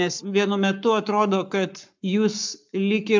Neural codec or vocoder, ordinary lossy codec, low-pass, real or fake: codec, 44.1 kHz, 7.8 kbps, Pupu-Codec; MP3, 64 kbps; 7.2 kHz; fake